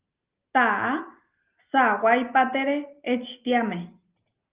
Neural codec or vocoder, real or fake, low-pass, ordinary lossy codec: none; real; 3.6 kHz; Opus, 32 kbps